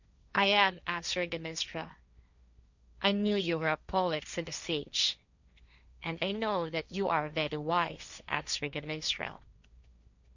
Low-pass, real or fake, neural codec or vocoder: 7.2 kHz; fake; codec, 16 kHz, 1.1 kbps, Voila-Tokenizer